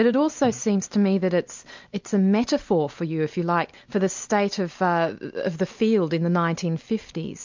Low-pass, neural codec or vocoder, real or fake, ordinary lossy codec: 7.2 kHz; none; real; MP3, 64 kbps